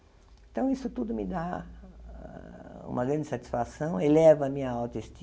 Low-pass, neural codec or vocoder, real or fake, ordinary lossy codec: none; none; real; none